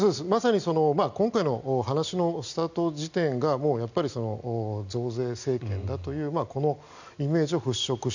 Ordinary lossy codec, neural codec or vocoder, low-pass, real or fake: none; none; 7.2 kHz; real